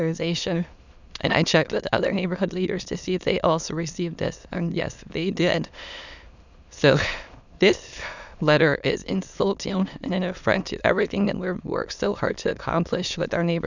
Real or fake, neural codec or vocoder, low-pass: fake; autoencoder, 22.05 kHz, a latent of 192 numbers a frame, VITS, trained on many speakers; 7.2 kHz